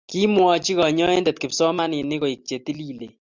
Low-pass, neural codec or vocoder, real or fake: 7.2 kHz; none; real